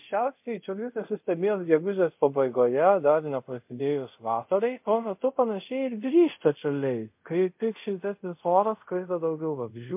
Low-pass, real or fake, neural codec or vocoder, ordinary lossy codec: 3.6 kHz; fake; codec, 24 kHz, 0.5 kbps, DualCodec; MP3, 32 kbps